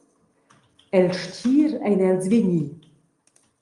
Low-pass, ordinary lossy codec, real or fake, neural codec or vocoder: 10.8 kHz; Opus, 32 kbps; real; none